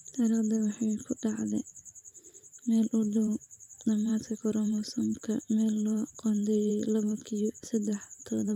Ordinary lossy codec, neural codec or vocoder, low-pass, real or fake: none; vocoder, 44.1 kHz, 128 mel bands every 512 samples, BigVGAN v2; 19.8 kHz; fake